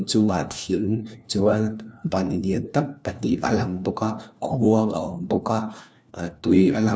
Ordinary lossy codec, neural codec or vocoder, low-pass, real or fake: none; codec, 16 kHz, 1 kbps, FunCodec, trained on LibriTTS, 50 frames a second; none; fake